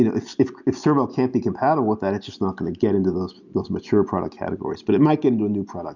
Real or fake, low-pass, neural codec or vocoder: real; 7.2 kHz; none